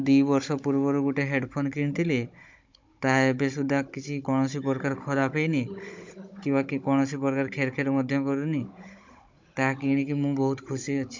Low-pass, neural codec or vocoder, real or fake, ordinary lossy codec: 7.2 kHz; codec, 16 kHz, 16 kbps, FunCodec, trained on Chinese and English, 50 frames a second; fake; MP3, 64 kbps